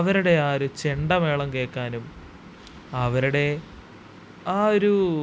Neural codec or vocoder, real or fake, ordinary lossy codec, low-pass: none; real; none; none